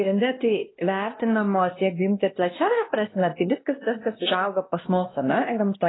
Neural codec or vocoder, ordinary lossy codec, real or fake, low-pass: codec, 16 kHz, 1 kbps, X-Codec, WavLM features, trained on Multilingual LibriSpeech; AAC, 16 kbps; fake; 7.2 kHz